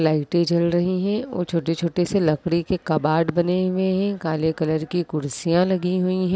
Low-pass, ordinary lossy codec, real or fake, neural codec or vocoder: none; none; real; none